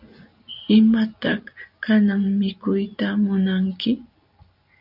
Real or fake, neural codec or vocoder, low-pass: real; none; 5.4 kHz